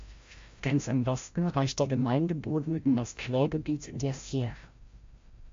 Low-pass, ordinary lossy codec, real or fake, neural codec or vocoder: 7.2 kHz; MP3, 48 kbps; fake; codec, 16 kHz, 0.5 kbps, FreqCodec, larger model